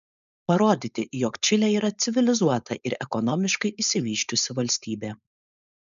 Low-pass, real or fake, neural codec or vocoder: 7.2 kHz; fake; codec, 16 kHz, 4.8 kbps, FACodec